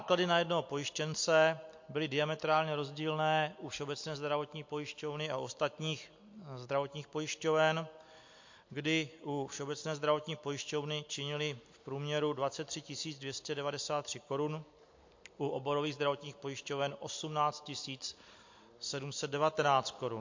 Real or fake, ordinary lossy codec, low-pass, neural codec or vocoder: real; MP3, 48 kbps; 7.2 kHz; none